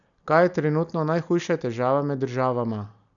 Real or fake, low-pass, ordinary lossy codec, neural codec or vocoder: real; 7.2 kHz; none; none